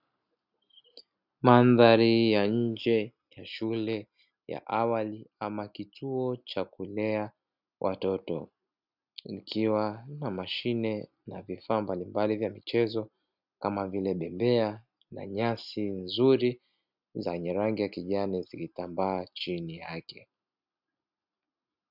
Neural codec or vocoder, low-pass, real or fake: none; 5.4 kHz; real